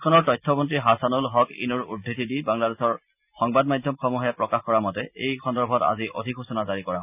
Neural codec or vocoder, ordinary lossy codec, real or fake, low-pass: none; none; real; 3.6 kHz